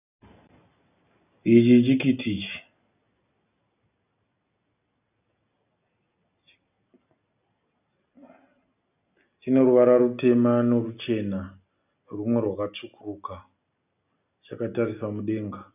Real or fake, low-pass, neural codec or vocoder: real; 3.6 kHz; none